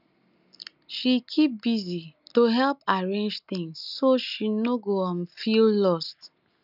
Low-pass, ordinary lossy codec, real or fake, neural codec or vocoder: 5.4 kHz; none; real; none